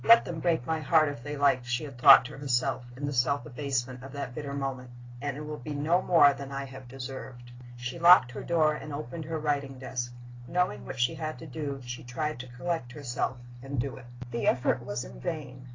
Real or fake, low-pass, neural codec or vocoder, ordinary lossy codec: real; 7.2 kHz; none; AAC, 32 kbps